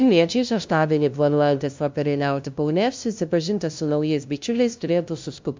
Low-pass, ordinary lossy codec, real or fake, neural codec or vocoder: 7.2 kHz; MP3, 64 kbps; fake; codec, 16 kHz, 0.5 kbps, FunCodec, trained on LibriTTS, 25 frames a second